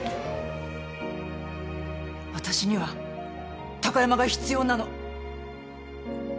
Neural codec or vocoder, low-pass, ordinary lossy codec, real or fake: none; none; none; real